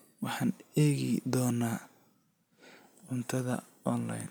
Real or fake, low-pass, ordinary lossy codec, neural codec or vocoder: real; none; none; none